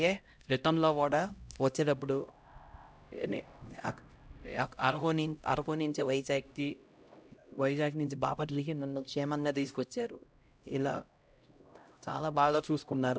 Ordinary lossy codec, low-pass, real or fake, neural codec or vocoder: none; none; fake; codec, 16 kHz, 0.5 kbps, X-Codec, HuBERT features, trained on LibriSpeech